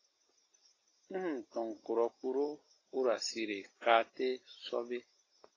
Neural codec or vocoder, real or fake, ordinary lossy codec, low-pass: none; real; AAC, 32 kbps; 7.2 kHz